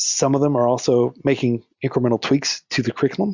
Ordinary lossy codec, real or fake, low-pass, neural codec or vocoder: Opus, 64 kbps; real; 7.2 kHz; none